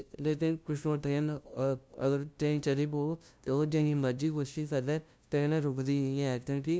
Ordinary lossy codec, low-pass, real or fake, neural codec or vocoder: none; none; fake; codec, 16 kHz, 0.5 kbps, FunCodec, trained on LibriTTS, 25 frames a second